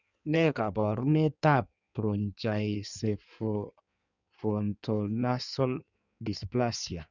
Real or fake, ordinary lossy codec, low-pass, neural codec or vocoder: fake; none; 7.2 kHz; codec, 16 kHz in and 24 kHz out, 1.1 kbps, FireRedTTS-2 codec